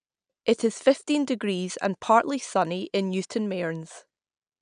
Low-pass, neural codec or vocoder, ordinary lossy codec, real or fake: 9.9 kHz; none; none; real